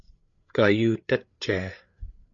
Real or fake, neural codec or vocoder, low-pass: fake; codec, 16 kHz, 8 kbps, FreqCodec, larger model; 7.2 kHz